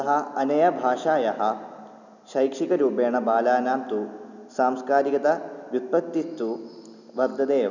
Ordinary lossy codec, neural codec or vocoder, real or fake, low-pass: none; none; real; 7.2 kHz